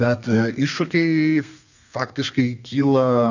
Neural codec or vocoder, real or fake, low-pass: codec, 32 kHz, 1.9 kbps, SNAC; fake; 7.2 kHz